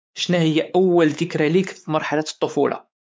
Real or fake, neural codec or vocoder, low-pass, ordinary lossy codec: fake; codec, 16 kHz, 4 kbps, X-Codec, WavLM features, trained on Multilingual LibriSpeech; none; none